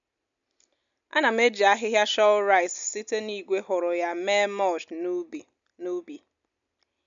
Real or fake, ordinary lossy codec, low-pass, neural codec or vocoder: real; none; 7.2 kHz; none